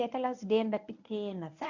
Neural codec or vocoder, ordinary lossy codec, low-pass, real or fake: codec, 24 kHz, 0.9 kbps, WavTokenizer, medium speech release version 1; none; 7.2 kHz; fake